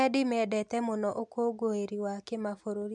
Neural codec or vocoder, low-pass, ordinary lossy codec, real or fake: none; 10.8 kHz; none; real